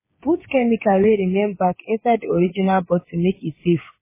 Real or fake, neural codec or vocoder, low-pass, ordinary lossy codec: fake; codec, 16 kHz, 8 kbps, FreqCodec, smaller model; 3.6 kHz; MP3, 16 kbps